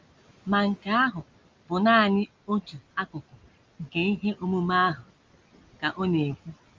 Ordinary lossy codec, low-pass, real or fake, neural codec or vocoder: Opus, 32 kbps; 7.2 kHz; real; none